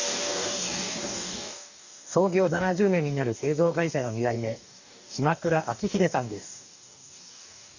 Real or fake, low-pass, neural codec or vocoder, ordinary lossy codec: fake; 7.2 kHz; codec, 44.1 kHz, 2.6 kbps, DAC; none